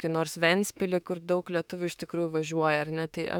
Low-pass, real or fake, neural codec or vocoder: 19.8 kHz; fake; autoencoder, 48 kHz, 32 numbers a frame, DAC-VAE, trained on Japanese speech